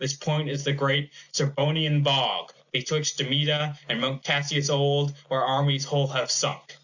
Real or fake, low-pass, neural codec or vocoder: real; 7.2 kHz; none